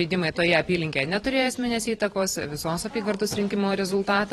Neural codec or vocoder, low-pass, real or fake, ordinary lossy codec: vocoder, 44.1 kHz, 128 mel bands every 512 samples, BigVGAN v2; 19.8 kHz; fake; AAC, 32 kbps